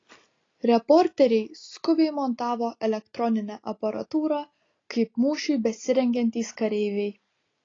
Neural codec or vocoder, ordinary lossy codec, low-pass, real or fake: none; AAC, 32 kbps; 7.2 kHz; real